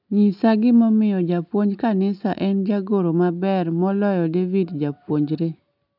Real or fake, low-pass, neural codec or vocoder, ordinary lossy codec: real; 5.4 kHz; none; none